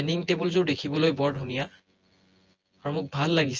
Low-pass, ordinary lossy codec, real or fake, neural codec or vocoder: 7.2 kHz; Opus, 24 kbps; fake; vocoder, 24 kHz, 100 mel bands, Vocos